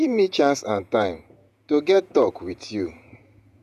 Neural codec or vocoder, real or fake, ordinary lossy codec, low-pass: vocoder, 48 kHz, 128 mel bands, Vocos; fake; none; 14.4 kHz